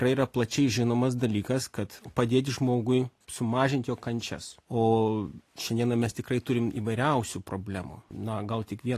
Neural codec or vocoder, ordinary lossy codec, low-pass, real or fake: none; AAC, 48 kbps; 14.4 kHz; real